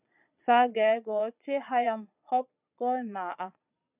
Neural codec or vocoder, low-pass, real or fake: vocoder, 22.05 kHz, 80 mel bands, Vocos; 3.6 kHz; fake